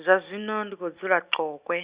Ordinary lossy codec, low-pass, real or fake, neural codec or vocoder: Opus, 64 kbps; 3.6 kHz; real; none